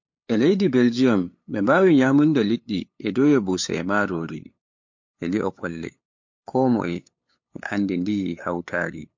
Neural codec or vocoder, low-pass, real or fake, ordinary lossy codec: codec, 16 kHz, 8 kbps, FunCodec, trained on LibriTTS, 25 frames a second; 7.2 kHz; fake; MP3, 48 kbps